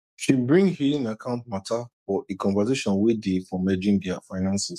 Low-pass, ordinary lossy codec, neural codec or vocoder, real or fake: 14.4 kHz; none; codec, 44.1 kHz, 7.8 kbps, DAC; fake